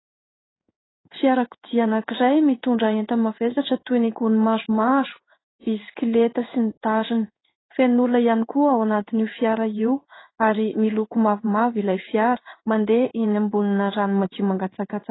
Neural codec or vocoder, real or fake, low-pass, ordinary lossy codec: codec, 16 kHz in and 24 kHz out, 1 kbps, XY-Tokenizer; fake; 7.2 kHz; AAC, 16 kbps